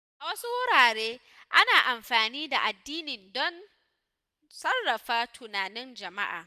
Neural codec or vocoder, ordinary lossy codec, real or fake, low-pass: none; none; real; 14.4 kHz